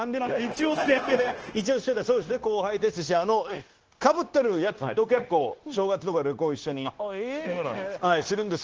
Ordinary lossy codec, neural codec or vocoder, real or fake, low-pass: Opus, 16 kbps; codec, 16 kHz, 0.9 kbps, LongCat-Audio-Codec; fake; 7.2 kHz